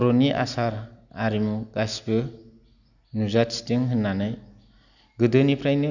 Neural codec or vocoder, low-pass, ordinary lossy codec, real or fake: none; 7.2 kHz; none; real